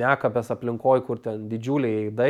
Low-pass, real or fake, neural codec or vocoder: 19.8 kHz; real; none